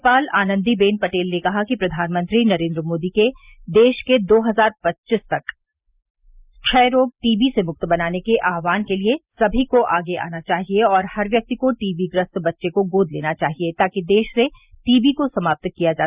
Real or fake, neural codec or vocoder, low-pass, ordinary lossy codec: real; none; 3.6 kHz; Opus, 64 kbps